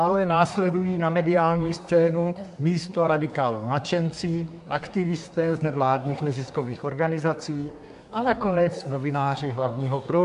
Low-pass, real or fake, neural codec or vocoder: 10.8 kHz; fake; codec, 24 kHz, 1 kbps, SNAC